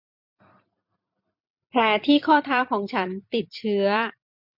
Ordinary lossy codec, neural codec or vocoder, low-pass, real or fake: MP3, 48 kbps; vocoder, 44.1 kHz, 128 mel bands every 256 samples, BigVGAN v2; 5.4 kHz; fake